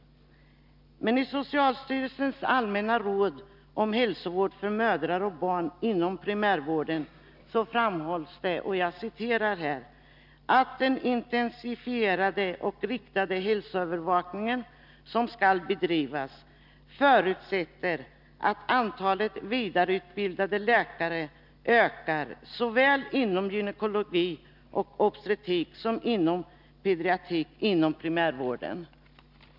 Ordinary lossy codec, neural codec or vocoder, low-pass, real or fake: none; none; 5.4 kHz; real